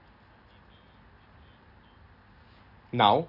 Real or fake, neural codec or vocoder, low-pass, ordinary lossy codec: real; none; 5.4 kHz; none